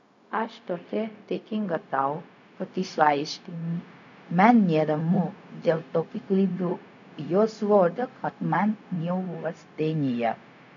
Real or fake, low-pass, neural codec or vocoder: fake; 7.2 kHz; codec, 16 kHz, 0.4 kbps, LongCat-Audio-Codec